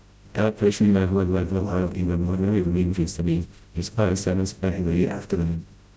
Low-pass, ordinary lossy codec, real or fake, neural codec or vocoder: none; none; fake; codec, 16 kHz, 0.5 kbps, FreqCodec, smaller model